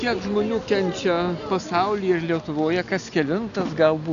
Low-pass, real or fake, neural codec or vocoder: 7.2 kHz; real; none